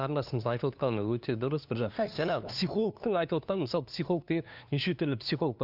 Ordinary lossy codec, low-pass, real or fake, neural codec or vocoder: none; 5.4 kHz; fake; codec, 16 kHz, 2 kbps, FunCodec, trained on LibriTTS, 25 frames a second